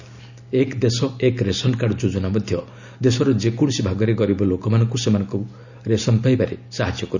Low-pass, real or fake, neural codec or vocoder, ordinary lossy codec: 7.2 kHz; real; none; none